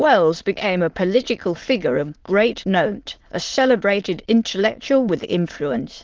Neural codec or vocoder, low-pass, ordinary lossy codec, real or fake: autoencoder, 22.05 kHz, a latent of 192 numbers a frame, VITS, trained on many speakers; 7.2 kHz; Opus, 16 kbps; fake